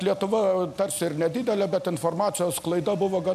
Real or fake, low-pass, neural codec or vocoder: real; 14.4 kHz; none